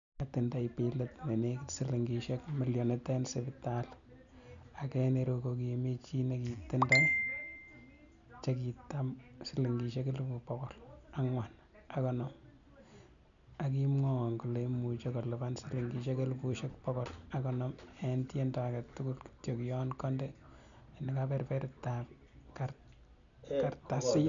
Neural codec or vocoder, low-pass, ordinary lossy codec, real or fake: none; 7.2 kHz; none; real